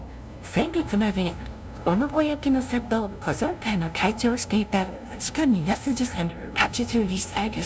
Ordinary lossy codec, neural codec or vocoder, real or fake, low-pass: none; codec, 16 kHz, 0.5 kbps, FunCodec, trained on LibriTTS, 25 frames a second; fake; none